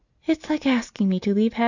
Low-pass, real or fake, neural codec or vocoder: 7.2 kHz; real; none